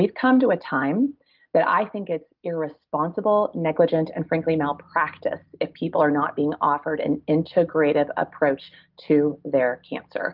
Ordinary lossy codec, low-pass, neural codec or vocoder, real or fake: Opus, 32 kbps; 5.4 kHz; none; real